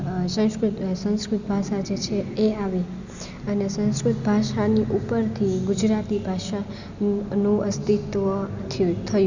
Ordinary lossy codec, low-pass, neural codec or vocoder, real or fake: none; 7.2 kHz; none; real